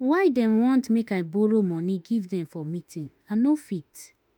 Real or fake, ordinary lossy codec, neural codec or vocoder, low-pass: fake; none; autoencoder, 48 kHz, 32 numbers a frame, DAC-VAE, trained on Japanese speech; none